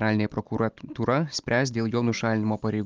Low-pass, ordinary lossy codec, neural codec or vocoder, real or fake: 7.2 kHz; Opus, 24 kbps; none; real